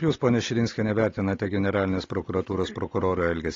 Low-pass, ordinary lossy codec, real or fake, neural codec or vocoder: 7.2 kHz; AAC, 32 kbps; real; none